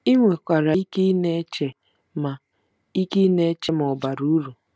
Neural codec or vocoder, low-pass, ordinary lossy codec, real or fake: none; none; none; real